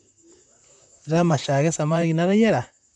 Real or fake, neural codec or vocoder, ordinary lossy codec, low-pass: fake; vocoder, 44.1 kHz, 128 mel bands, Pupu-Vocoder; none; 10.8 kHz